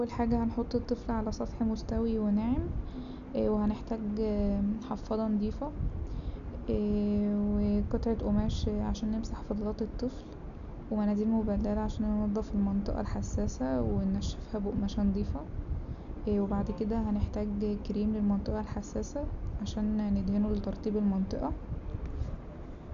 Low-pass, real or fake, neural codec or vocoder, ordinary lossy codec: 7.2 kHz; real; none; none